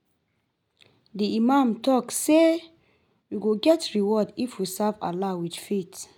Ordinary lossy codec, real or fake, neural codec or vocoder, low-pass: none; real; none; 19.8 kHz